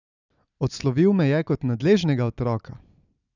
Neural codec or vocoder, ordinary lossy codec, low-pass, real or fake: none; none; 7.2 kHz; real